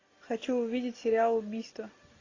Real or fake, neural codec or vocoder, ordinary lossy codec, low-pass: real; none; AAC, 32 kbps; 7.2 kHz